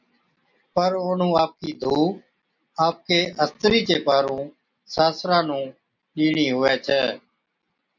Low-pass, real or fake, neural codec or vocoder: 7.2 kHz; real; none